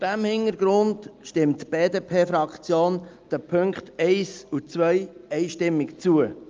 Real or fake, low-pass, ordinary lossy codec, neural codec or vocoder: real; 7.2 kHz; Opus, 32 kbps; none